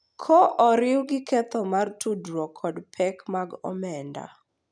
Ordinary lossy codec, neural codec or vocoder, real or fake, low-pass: none; none; real; 9.9 kHz